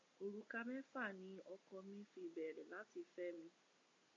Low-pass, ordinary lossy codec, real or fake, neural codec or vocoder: 7.2 kHz; AAC, 32 kbps; real; none